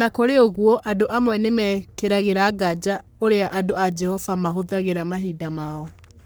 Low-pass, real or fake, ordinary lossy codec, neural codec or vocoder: none; fake; none; codec, 44.1 kHz, 3.4 kbps, Pupu-Codec